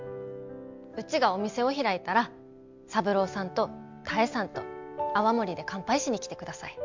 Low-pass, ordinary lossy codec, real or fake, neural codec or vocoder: 7.2 kHz; MP3, 64 kbps; real; none